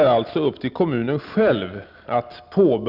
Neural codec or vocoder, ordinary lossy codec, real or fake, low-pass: none; none; real; 5.4 kHz